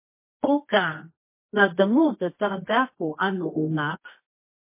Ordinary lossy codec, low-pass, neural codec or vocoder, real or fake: MP3, 24 kbps; 3.6 kHz; codec, 24 kHz, 0.9 kbps, WavTokenizer, medium music audio release; fake